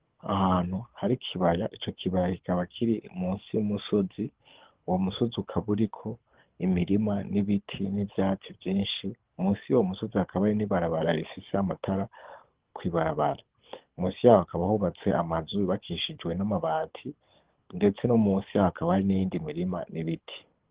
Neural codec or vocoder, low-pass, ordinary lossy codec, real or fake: codec, 24 kHz, 6 kbps, HILCodec; 3.6 kHz; Opus, 16 kbps; fake